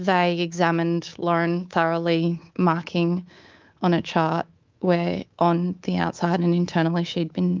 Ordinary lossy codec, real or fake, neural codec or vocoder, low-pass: Opus, 24 kbps; fake; codec, 24 kHz, 3.1 kbps, DualCodec; 7.2 kHz